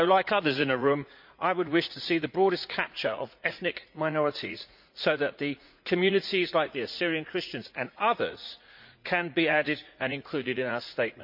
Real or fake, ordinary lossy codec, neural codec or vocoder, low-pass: fake; MP3, 48 kbps; vocoder, 44.1 kHz, 80 mel bands, Vocos; 5.4 kHz